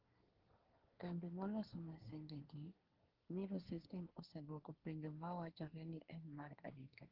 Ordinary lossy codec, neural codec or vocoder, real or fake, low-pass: Opus, 16 kbps; codec, 24 kHz, 1 kbps, SNAC; fake; 5.4 kHz